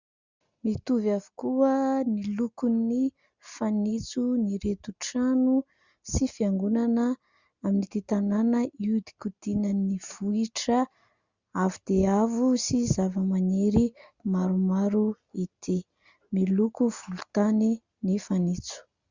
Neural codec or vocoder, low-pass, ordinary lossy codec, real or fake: none; 7.2 kHz; Opus, 64 kbps; real